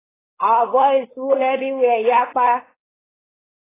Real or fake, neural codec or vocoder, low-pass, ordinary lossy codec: fake; codec, 16 kHz, 4.8 kbps, FACodec; 3.6 kHz; AAC, 16 kbps